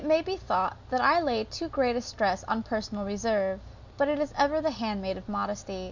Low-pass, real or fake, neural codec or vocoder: 7.2 kHz; real; none